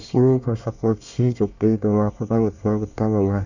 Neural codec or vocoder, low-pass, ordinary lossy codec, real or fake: codec, 24 kHz, 1 kbps, SNAC; 7.2 kHz; none; fake